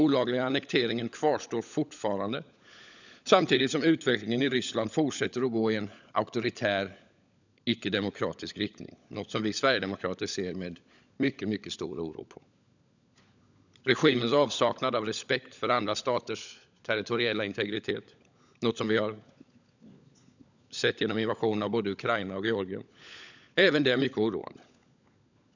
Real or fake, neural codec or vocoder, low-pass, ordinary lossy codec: fake; codec, 16 kHz, 16 kbps, FunCodec, trained on LibriTTS, 50 frames a second; 7.2 kHz; none